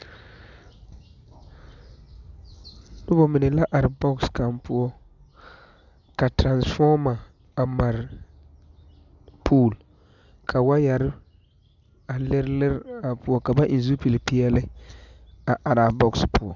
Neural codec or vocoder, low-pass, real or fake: none; 7.2 kHz; real